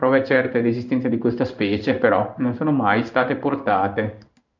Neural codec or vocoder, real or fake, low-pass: codec, 16 kHz in and 24 kHz out, 1 kbps, XY-Tokenizer; fake; 7.2 kHz